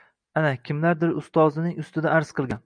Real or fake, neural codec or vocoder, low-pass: real; none; 9.9 kHz